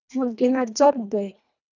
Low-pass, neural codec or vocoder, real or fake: 7.2 kHz; codec, 24 kHz, 1.5 kbps, HILCodec; fake